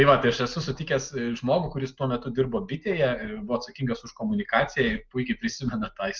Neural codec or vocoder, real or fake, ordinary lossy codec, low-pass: none; real; Opus, 32 kbps; 7.2 kHz